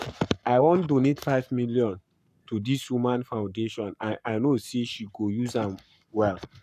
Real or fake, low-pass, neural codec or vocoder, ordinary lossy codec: fake; 14.4 kHz; codec, 44.1 kHz, 7.8 kbps, Pupu-Codec; none